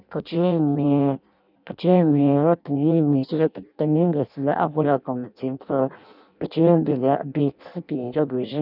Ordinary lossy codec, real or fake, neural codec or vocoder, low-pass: none; fake; codec, 16 kHz in and 24 kHz out, 0.6 kbps, FireRedTTS-2 codec; 5.4 kHz